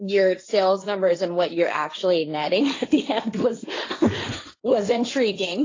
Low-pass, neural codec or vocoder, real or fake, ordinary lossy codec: 7.2 kHz; codec, 16 kHz, 1.1 kbps, Voila-Tokenizer; fake; AAC, 32 kbps